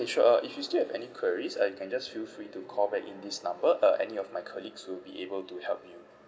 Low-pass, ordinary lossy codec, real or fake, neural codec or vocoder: none; none; real; none